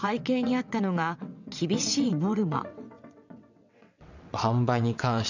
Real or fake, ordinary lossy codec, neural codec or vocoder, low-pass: fake; none; vocoder, 22.05 kHz, 80 mel bands, WaveNeXt; 7.2 kHz